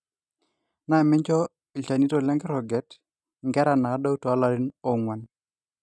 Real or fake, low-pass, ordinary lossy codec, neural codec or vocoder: real; none; none; none